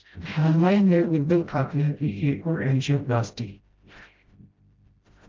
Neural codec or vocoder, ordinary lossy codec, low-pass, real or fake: codec, 16 kHz, 0.5 kbps, FreqCodec, smaller model; Opus, 24 kbps; 7.2 kHz; fake